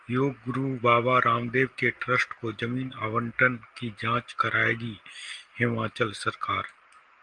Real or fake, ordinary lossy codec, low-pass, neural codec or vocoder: real; Opus, 24 kbps; 9.9 kHz; none